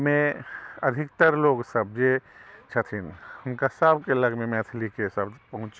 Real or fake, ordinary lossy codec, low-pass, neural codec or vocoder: real; none; none; none